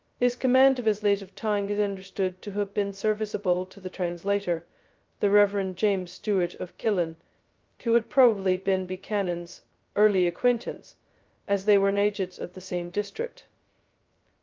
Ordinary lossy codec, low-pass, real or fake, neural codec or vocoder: Opus, 32 kbps; 7.2 kHz; fake; codec, 16 kHz, 0.2 kbps, FocalCodec